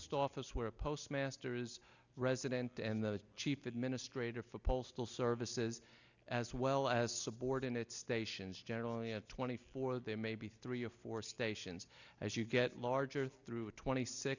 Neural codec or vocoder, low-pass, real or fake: none; 7.2 kHz; real